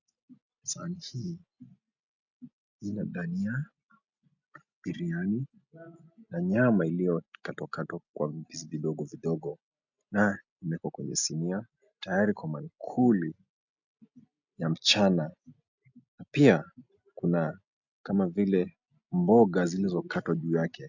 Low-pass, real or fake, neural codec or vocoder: 7.2 kHz; real; none